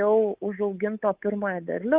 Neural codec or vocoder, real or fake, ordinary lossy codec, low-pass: codec, 24 kHz, 3.1 kbps, DualCodec; fake; Opus, 32 kbps; 3.6 kHz